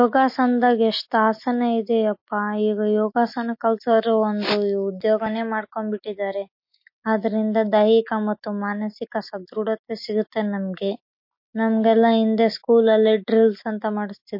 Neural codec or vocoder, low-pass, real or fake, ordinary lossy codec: none; 5.4 kHz; real; MP3, 32 kbps